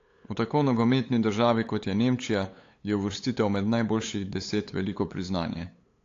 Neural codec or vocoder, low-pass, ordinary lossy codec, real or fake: codec, 16 kHz, 8 kbps, FunCodec, trained on LibriTTS, 25 frames a second; 7.2 kHz; AAC, 48 kbps; fake